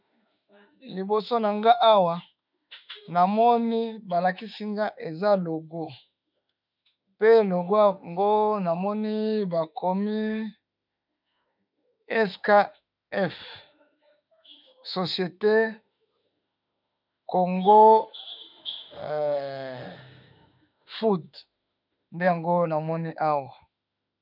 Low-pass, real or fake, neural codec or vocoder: 5.4 kHz; fake; autoencoder, 48 kHz, 32 numbers a frame, DAC-VAE, trained on Japanese speech